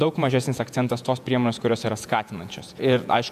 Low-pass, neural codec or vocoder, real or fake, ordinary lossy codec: 14.4 kHz; vocoder, 48 kHz, 128 mel bands, Vocos; fake; AAC, 96 kbps